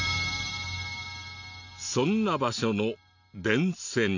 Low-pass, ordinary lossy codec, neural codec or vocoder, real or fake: 7.2 kHz; none; none; real